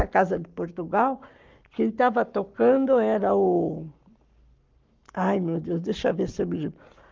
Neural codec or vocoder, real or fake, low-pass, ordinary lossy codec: codec, 44.1 kHz, 7.8 kbps, Pupu-Codec; fake; 7.2 kHz; Opus, 32 kbps